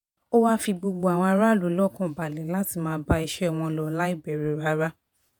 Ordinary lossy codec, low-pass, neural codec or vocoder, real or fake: none; none; vocoder, 48 kHz, 128 mel bands, Vocos; fake